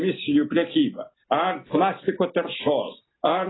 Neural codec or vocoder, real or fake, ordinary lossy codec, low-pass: none; real; AAC, 16 kbps; 7.2 kHz